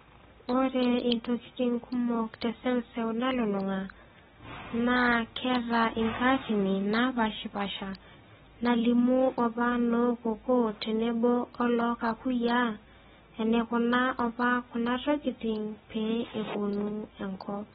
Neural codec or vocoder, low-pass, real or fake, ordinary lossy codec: none; 7.2 kHz; real; AAC, 16 kbps